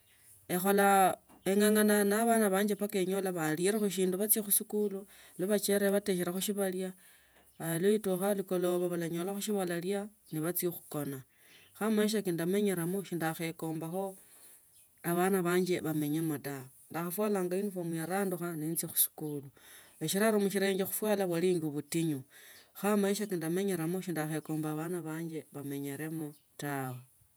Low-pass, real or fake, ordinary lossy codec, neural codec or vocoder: none; fake; none; vocoder, 48 kHz, 128 mel bands, Vocos